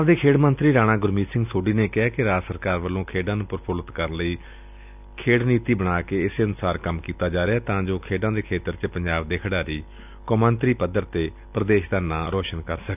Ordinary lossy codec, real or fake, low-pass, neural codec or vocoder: none; real; 3.6 kHz; none